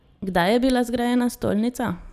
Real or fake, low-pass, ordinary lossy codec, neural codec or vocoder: real; 14.4 kHz; none; none